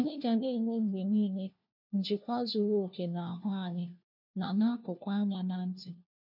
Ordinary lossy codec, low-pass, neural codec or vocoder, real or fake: none; 5.4 kHz; codec, 16 kHz, 1 kbps, FunCodec, trained on LibriTTS, 50 frames a second; fake